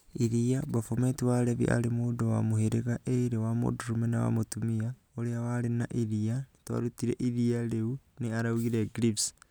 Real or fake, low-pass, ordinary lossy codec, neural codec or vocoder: real; none; none; none